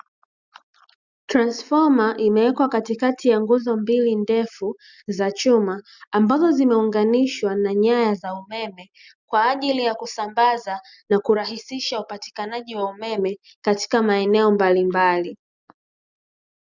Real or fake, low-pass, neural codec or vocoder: real; 7.2 kHz; none